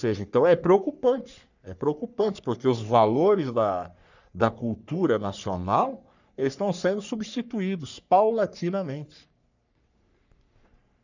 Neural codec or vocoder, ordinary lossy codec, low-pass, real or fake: codec, 44.1 kHz, 3.4 kbps, Pupu-Codec; none; 7.2 kHz; fake